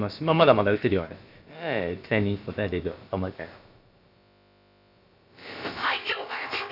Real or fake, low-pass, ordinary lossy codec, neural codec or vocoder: fake; 5.4 kHz; none; codec, 16 kHz, about 1 kbps, DyCAST, with the encoder's durations